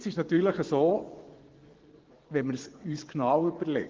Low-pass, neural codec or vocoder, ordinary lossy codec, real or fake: 7.2 kHz; vocoder, 24 kHz, 100 mel bands, Vocos; Opus, 16 kbps; fake